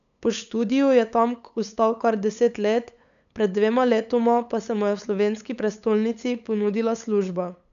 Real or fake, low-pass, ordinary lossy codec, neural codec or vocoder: fake; 7.2 kHz; none; codec, 16 kHz, 8 kbps, FunCodec, trained on LibriTTS, 25 frames a second